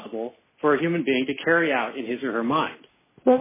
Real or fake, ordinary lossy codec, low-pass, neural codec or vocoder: real; MP3, 16 kbps; 3.6 kHz; none